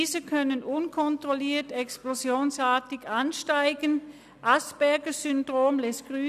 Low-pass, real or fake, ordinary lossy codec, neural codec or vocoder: 14.4 kHz; real; none; none